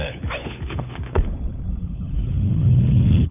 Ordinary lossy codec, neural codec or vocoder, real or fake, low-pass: none; codec, 16 kHz, 4 kbps, FunCodec, trained on LibriTTS, 50 frames a second; fake; 3.6 kHz